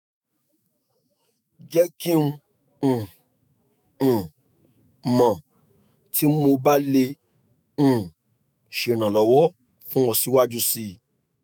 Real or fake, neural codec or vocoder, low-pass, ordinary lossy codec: fake; autoencoder, 48 kHz, 128 numbers a frame, DAC-VAE, trained on Japanese speech; none; none